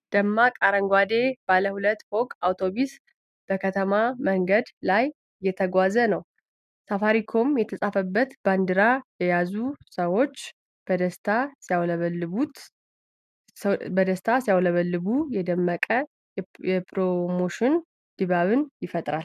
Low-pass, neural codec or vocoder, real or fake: 14.4 kHz; none; real